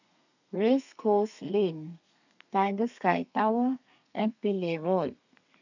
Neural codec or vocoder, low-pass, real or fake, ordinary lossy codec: codec, 32 kHz, 1.9 kbps, SNAC; 7.2 kHz; fake; none